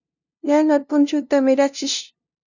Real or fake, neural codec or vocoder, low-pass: fake; codec, 16 kHz, 0.5 kbps, FunCodec, trained on LibriTTS, 25 frames a second; 7.2 kHz